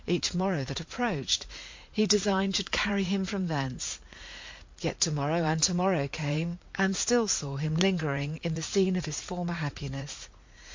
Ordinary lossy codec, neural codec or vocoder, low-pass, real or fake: MP3, 48 kbps; vocoder, 44.1 kHz, 80 mel bands, Vocos; 7.2 kHz; fake